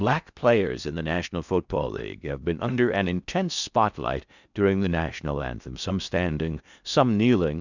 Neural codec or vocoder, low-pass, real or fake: codec, 16 kHz in and 24 kHz out, 0.6 kbps, FocalCodec, streaming, 4096 codes; 7.2 kHz; fake